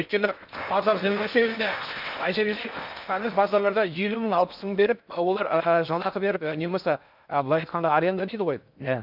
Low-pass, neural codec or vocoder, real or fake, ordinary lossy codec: 5.4 kHz; codec, 16 kHz in and 24 kHz out, 0.8 kbps, FocalCodec, streaming, 65536 codes; fake; none